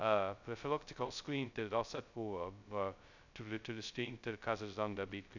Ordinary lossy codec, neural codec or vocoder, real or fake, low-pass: none; codec, 16 kHz, 0.2 kbps, FocalCodec; fake; 7.2 kHz